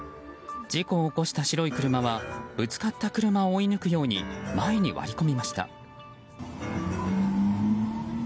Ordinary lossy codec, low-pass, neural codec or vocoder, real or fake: none; none; none; real